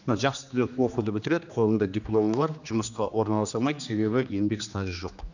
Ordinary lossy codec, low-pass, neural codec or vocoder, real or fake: none; 7.2 kHz; codec, 16 kHz, 2 kbps, X-Codec, HuBERT features, trained on general audio; fake